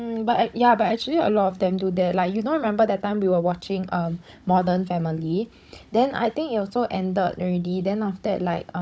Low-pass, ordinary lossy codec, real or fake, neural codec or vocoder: none; none; fake; codec, 16 kHz, 16 kbps, FunCodec, trained on Chinese and English, 50 frames a second